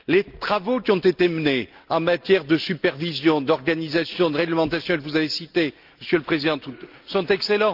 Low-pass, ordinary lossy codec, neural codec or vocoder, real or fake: 5.4 kHz; Opus, 24 kbps; none; real